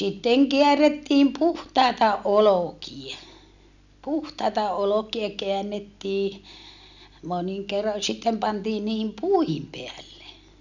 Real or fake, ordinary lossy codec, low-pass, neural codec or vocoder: real; none; 7.2 kHz; none